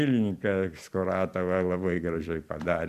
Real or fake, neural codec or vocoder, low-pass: fake; vocoder, 44.1 kHz, 128 mel bands every 256 samples, BigVGAN v2; 14.4 kHz